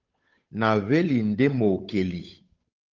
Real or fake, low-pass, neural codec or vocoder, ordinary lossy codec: fake; 7.2 kHz; codec, 16 kHz, 8 kbps, FunCodec, trained on Chinese and English, 25 frames a second; Opus, 24 kbps